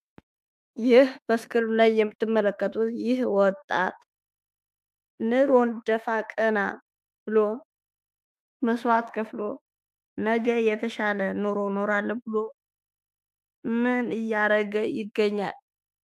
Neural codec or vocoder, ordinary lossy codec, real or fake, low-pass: autoencoder, 48 kHz, 32 numbers a frame, DAC-VAE, trained on Japanese speech; AAC, 96 kbps; fake; 14.4 kHz